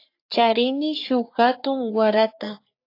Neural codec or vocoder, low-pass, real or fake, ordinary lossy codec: codec, 44.1 kHz, 3.4 kbps, Pupu-Codec; 5.4 kHz; fake; AAC, 32 kbps